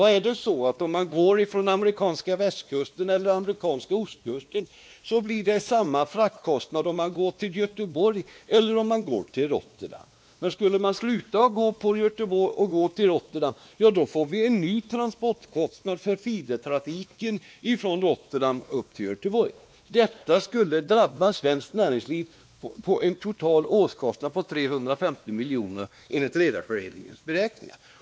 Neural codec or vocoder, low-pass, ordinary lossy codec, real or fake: codec, 16 kHz, 2 kbps, X-Codec, WavLM features, trained on Multilingual LibriSpeech; none; none; fake